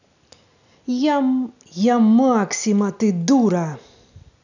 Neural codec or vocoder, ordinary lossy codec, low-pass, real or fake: none; none; 7.2 kHz; real